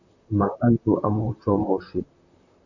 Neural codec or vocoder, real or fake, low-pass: vocoder, 44.1 kHz, 128 mel bands, Pupu-Vocoder; fake; 7.2 kHz